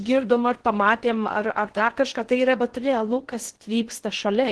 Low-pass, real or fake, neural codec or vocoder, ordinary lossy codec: 10.8 kHz; fake; codec, 16 kHz in and 24 kHz out, 0.6 kbps, FocalCodec, streaming, 2048 codes; Opus, 16 kbps